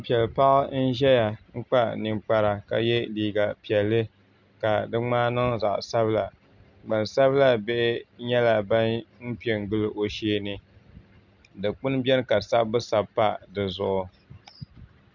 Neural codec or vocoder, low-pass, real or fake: none; 7.2 kHz; real